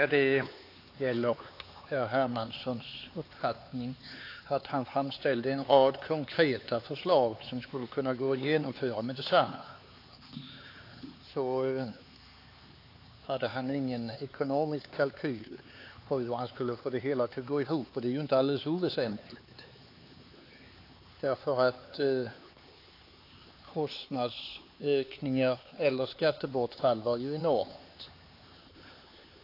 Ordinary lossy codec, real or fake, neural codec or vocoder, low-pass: AAC, 32 kbps; fake; codec, 16 kHz, 4 kbps, X-Codec, HuBERT features, trained on LibriSpeech; 5.4 kHz